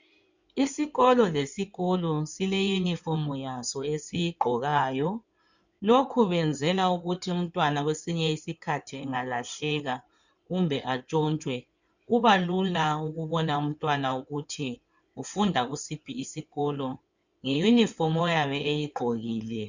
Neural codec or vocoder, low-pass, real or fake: codec, 16 kHz in and 24 kHz out, 2.2 kbps, FireRedTTS-2 codec; 7.2 kHz; fake